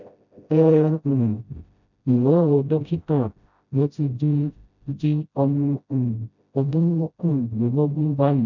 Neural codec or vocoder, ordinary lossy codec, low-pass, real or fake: codec, 16 kHz, 0.5 kbps, FreqCodec, smaller model; none; 7.2 kHz; fake